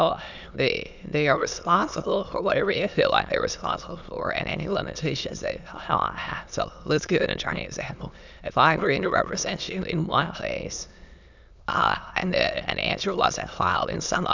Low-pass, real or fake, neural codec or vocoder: 7.2 kHz; fake; autoencoder, 22.05 kHz, a latent of 192 numbers a frame, VITS, trained on many speakers